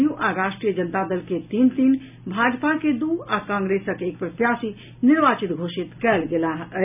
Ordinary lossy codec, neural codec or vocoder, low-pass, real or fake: none; none; 3.6 kHz; real